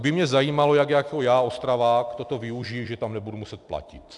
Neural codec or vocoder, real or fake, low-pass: none; real; 10.8 kHz